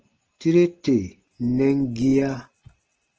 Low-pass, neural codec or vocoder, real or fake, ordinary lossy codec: 7.2 kHz; none; real; Opus, 16 kbps